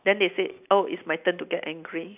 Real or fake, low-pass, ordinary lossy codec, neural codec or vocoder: real; 3.6 kHz; none; none